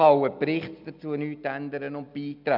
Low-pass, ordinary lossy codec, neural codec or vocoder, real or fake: 5.4 kHz; none; none; real